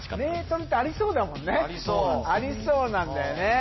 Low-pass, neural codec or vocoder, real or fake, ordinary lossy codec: 7.2 kHz; none; real; MP3, 24 kbps